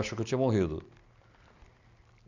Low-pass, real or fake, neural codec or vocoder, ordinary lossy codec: 7.2 kHz; real; none; none